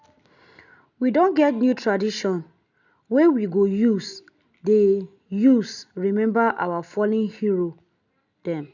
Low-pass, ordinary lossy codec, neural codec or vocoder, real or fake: 7.2 kHz; none; none; real